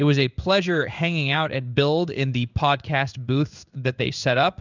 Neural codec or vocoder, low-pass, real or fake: codec, 16 kHz in and 24 kHz out, 1 kbps, XY-Tokenizer; 7.2 kHz; fake